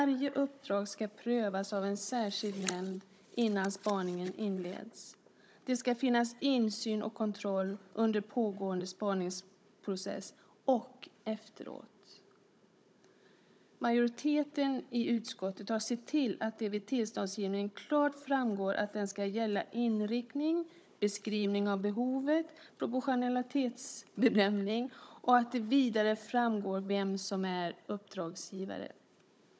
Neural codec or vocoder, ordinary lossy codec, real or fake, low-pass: codec, 16 kHz, 16 kbps, FunCodec, trained on Chinese and English, 50 frames a second; none; fake; none